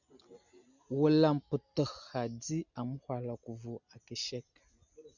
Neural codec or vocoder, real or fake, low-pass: none; real; 7.2 kHz